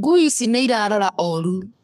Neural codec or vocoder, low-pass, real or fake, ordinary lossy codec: codec, 32 kHz, 1.9 kbps, SNAC; 14.4 kHz; fake; none